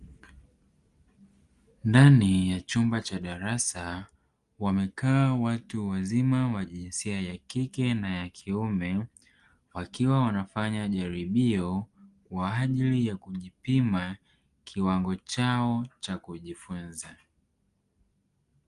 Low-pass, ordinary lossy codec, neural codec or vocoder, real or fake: 10.8 kHz; Opus, 24 kbps; none; real